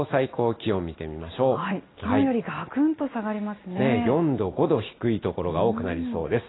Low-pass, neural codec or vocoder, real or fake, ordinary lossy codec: 7.2 kHz; none; real; AAC, 16 kbps